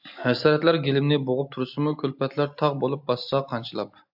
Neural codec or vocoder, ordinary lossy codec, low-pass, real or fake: none; AAC, 48 kbps; 5.4 kHz; real